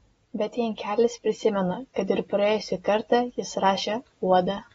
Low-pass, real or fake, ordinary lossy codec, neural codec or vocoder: 19.8 kHz; real; AAC, 24 kbps; none